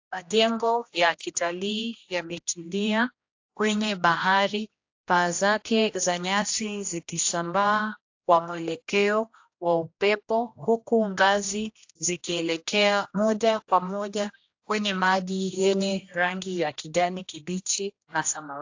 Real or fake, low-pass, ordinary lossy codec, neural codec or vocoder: fake; 7.2 kHz; AAC, 48 kbps; codec, 16 kHz, 1 kbps, X-Codec, HuBERT features, trained on general audio